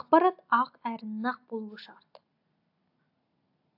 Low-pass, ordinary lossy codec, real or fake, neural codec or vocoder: 5.4 kHz; AAC, 48 kbps; real; none